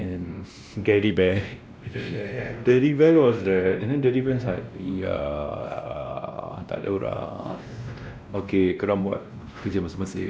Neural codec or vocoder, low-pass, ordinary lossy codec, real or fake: codec, 16 kHz, 1 kbps, X-Codec, WavLM features, trained on Multilingual LibriSpeech; none; none; fake